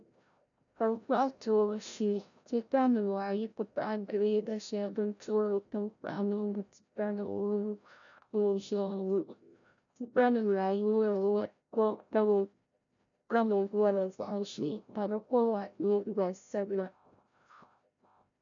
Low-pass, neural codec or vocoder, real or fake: 7.2 kHz; codec, 16 kHz, 0.5 kbps, FreqCodec, larger model; fake